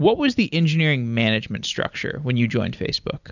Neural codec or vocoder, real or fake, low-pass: none; real; 7.2 kHz